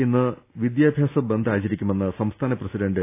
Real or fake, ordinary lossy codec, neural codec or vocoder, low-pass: real; AAC, 24 kbps; none; 3.6 kHz